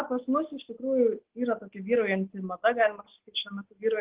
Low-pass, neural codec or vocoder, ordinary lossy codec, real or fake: 3.6 kHz; none; Opus, 16 kbps; real